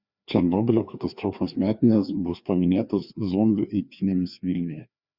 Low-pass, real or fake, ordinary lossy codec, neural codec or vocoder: 5.4 kHz; fake; Opus, 64 kbps; codec, 16 kHz, 2 kbps, FreqCodec, larger model